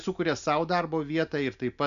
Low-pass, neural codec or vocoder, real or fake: 7.2 kHz; none; real